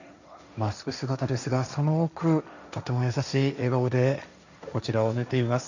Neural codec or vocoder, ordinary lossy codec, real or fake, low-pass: codec, 16 kHz, 1.1 kbps, Voila-Tokenizer; none; fake; 7.2 kHz